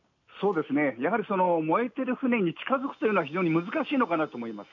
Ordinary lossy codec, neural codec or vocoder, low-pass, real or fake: none; none; 7.2 kHz; real